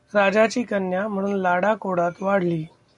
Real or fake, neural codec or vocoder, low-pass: real; none; 10.8 kHz